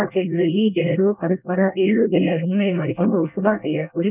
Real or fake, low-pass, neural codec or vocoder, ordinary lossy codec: fake; 3.6 kHz; codec, 24 kHz, 1 kbps, SNAC; none